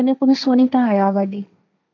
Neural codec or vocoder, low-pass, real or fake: codec, 16 kHz, 1.1 kbps, Voila-Tokenizer; 7.2 kHz; fake